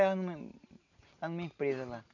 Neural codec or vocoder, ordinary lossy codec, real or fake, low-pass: none; none; real; 7.2 kHz